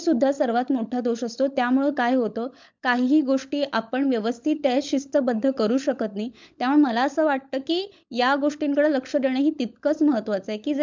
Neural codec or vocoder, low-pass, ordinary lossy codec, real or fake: codec, 16 kHz, 16 kbps, FunCodec, trained on LibriTTS, 50 frames a second; 7.2 kHz; MP3, 64 kbps; fake